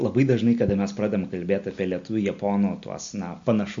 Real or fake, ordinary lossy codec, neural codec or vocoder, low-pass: real; MP3, 48 kbps; none; 7.2 kHz